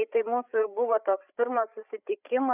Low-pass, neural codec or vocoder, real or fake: 3.6 kHz; codec, 16 kHz, 8 kbps, FreqCodec, larger model; fake